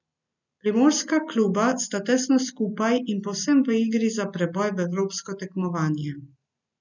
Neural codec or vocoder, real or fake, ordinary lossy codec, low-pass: none; real; none; 7.2 kHz